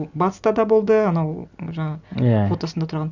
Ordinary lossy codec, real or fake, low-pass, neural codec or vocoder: Opus, 64 kbps; real; 7.2 kHz; none